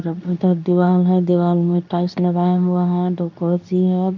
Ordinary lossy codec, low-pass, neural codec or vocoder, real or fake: none; 7.2 kHz; codec, 24 kHz, 0.9 kbps, WavTokenizer, medium speech release version 2; fake